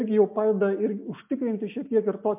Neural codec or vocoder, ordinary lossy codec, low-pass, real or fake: none; MP3, 32 kbps; 3.6 kHz; real